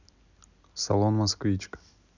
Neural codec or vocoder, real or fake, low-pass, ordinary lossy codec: none; real; 7.2 kHz; none